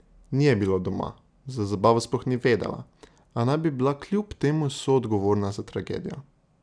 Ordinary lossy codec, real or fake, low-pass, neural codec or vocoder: none; real; 9.9 kHz; none